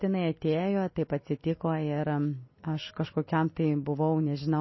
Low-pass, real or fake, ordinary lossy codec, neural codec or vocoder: 7.2 kHz; real; MP3, 24 kbps; none